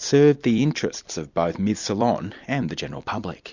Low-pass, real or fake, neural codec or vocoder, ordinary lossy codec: 7.2 kHz; fake; vocoder, 44.1 kHz, 128 mel bands every 256 samples, BigVGAN v2; Opus, 64 kbps